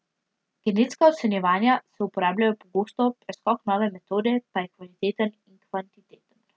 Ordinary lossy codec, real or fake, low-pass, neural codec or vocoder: none; real; none; none